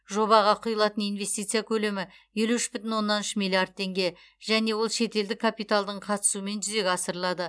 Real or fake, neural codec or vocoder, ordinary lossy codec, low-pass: real; none; none; none